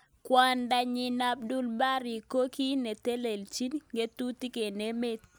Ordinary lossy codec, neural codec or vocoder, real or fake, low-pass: none; none; real; none